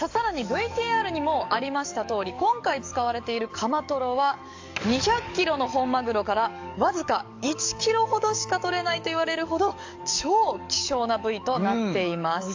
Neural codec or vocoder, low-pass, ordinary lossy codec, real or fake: codec, 44.1 kHz, 7.8 kbps, DAC; 7.2 kHz; AAC, 48 kbps; fake